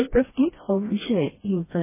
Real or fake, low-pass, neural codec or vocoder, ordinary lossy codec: fake; 3.6 kHz; codec, 16 kHz, 1 kbps, FreqCodec, smaller model; MP3, 16 kbps